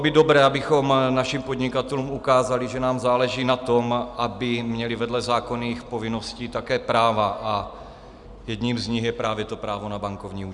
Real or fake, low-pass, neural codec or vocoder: real; 10.8 kHz; none